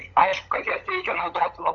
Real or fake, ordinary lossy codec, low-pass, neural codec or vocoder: fake; Opus, 64 kbps; 7.2 kHz; codec, 16 kHz, 16 kbps, FunCodec, trained on LibriTTS, 50 frames a second